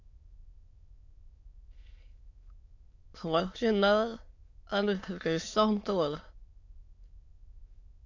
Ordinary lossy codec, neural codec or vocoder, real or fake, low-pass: AAC, 48 kbps; autoencoder, 22.05 kHz, a latent of 192 numbers a frame, VITS, trained on many speakers; fake; 7.2 kHz